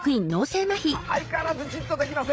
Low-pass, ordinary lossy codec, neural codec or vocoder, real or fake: none; none; codec, 16 kHz, 8 kbps, FreqCodec, larger model; fake